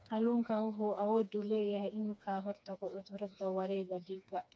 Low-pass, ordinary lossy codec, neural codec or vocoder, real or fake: none; none; codec, 16 kHz, 2 kbps, FreqCodec, smaller model; fake